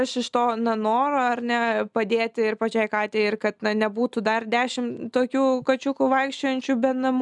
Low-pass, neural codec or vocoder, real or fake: 10.8 kHz; none; real